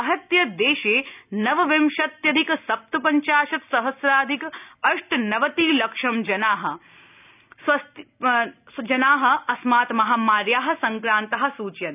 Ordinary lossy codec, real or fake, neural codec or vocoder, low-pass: none; real; none; 3.6 kHz